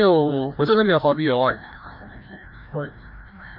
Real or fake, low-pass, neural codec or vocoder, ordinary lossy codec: fake; 5.4 kHz; codec, 16 kHz, 1 kbps, FreqCodec, larger model; MP3, 48 kbps